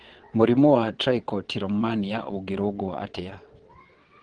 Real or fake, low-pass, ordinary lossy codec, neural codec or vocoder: fake; 9.9 kHz; Opus, 16 kbps; vocoder, 22.05 kHz, 80 mel bands, WaveNeXt